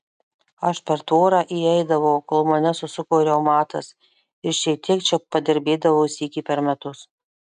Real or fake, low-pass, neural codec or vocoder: real; 10.8 kHz; none